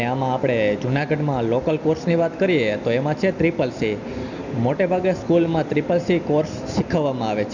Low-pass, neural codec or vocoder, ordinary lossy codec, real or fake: 7.2 kHz; none; none; real